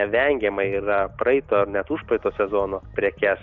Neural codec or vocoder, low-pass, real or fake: none; 7.2 kHz; real